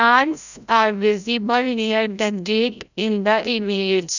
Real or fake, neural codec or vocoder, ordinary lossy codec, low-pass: fake; codec, 16 kHz, 0.5 kbps, FreqCodec, larger model; none; 7.2 kHz